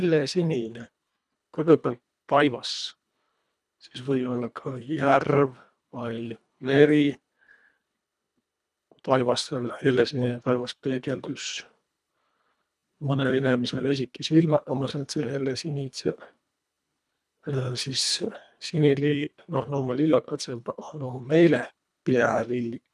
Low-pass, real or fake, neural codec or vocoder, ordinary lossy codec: none; fake; codec, 24 kHz, 1.5 kbps, HILCodec; none